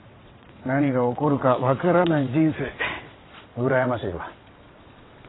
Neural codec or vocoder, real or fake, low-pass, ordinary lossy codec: vocoder, 22.05 kHz, 80 mel bands, WaveNeXt; fake; 7.2 kHz; AAC, 16 kbps